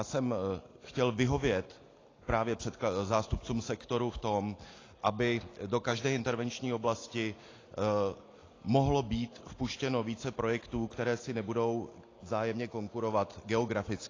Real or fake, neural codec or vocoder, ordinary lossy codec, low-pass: real; none; AAC, 32 kbps; 7.2 kHz